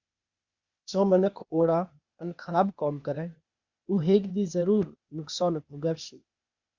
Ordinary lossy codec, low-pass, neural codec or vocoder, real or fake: Opus, 64 kbps; 7.2 kHz; codec, 16 kHz, 0.8 kbps, ZipCodec; fake